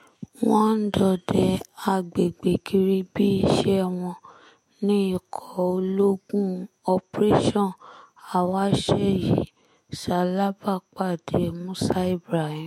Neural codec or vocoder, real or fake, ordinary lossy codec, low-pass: autoencoder, 48 kHz, 128 numbers a frame, DAC-VAE, trained on Japanese speech; fake; MP3, 64 kbps; 19.8 kHz